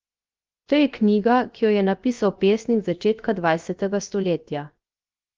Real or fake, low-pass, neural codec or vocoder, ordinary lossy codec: fake; 7.2 kHz; codec, 16 kHz, 0.7 kbps, FocalCodec; Opus, 32 kbps